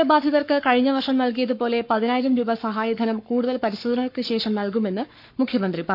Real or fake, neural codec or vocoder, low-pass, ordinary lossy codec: fake; codec, 44.1 kHz, 7.8 kbps, Pupu-Codec; 5.4 kHz; none